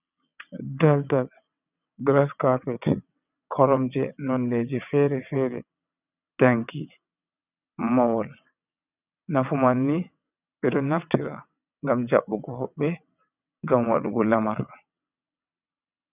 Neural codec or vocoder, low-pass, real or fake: vocoder, 22.05 kHz, 80 mel bands, WaveNeXt; 3.6 kHz; fake